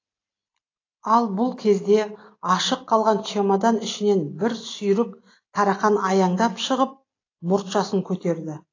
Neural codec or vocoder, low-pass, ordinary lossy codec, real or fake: none; 7.2 kHz; AAC, 32 kbps; real